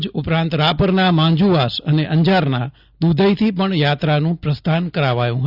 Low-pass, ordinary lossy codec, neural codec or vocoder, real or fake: 5.4 kHz; Opus, 64 kbps; none; real